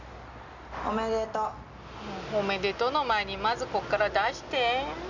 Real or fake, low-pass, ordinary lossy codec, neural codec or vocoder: real; 7.2 kHz; none; none